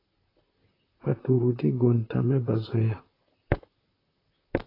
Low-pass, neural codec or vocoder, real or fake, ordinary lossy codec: 5.4 kHz; vocoder, 44.1 kHz, 128 mel bands, Pupu-Vocoder; fake; AAC, 24 kbps